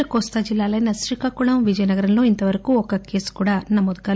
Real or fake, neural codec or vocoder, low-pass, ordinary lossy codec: real; none; none; none